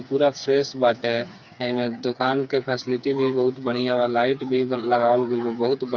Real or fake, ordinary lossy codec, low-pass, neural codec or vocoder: fake; Opus, 64 kbps; 7.2 kHz; codec, 16 kHz, 4 kbps, FreqCodec, smaller model